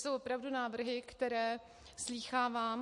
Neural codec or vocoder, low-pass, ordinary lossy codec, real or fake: none; 10.8 kHz; MP3, 48 kbps; real